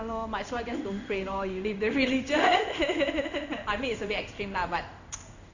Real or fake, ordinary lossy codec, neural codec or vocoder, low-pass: fake; none; codec, 16 kHz in and 24 kHz out, 1 kbps, XY-Tokenizer; 7.2 kHz